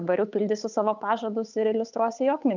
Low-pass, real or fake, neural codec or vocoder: 7.2 kHz; fake; codec, 16 kHz, 8 kbps, FunCodec, trained on Chinese and English, 25 frames a second